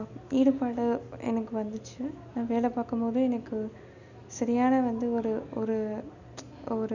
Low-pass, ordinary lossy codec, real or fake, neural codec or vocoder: 7.2 kHz; none; real; none